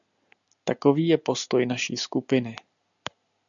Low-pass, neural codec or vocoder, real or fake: 7.2 kHz; none; real